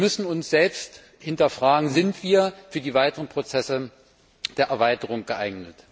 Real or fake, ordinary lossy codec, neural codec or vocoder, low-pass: real; none; none; none